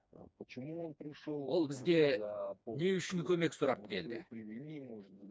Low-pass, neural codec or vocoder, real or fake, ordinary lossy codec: none; codec, 16 kHz, 2 kbps, FreqCodec, smaller model; fake; none